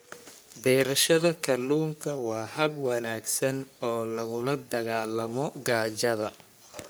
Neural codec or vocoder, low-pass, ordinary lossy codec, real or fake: codec, 44.1 kHz, 3.4 kbps, Pupu-Codec; none; none; fake